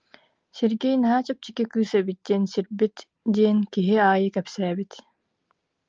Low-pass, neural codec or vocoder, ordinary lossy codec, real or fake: 7.2 kHz; none; Opus, 24 kbps; real